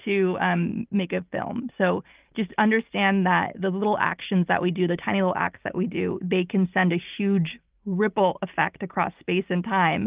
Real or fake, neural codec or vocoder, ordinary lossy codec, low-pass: real; none; Opus, 32 kbps; 3.6 kHz